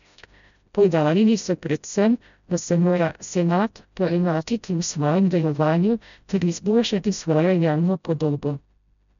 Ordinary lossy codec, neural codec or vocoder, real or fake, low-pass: none; codec, 16 kHz, 0.5 kbps, FreqCodec, smaller model; fake; 7.2 kHz